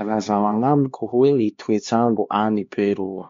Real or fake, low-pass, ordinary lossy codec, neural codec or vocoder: fake; 7.2 kHz; MP3, 48 kbps; codec, 16 kHz, 2 kbps, X-Codec, HuBERT features, trained on LibriSpeech